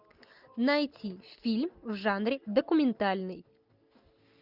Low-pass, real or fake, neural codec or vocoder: 5.4 kHz; real; none